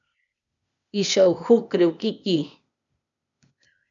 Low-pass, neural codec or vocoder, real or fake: 7.2 kHz; codec, 16 kHz, 0.8 kbps, ZipCodec; fake